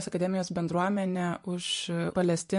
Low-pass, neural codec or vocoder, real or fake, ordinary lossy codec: 10.8 kHz; vocoder, 24 kHz, 100 mel bands, Vocos; fake; MP3, 48 kbps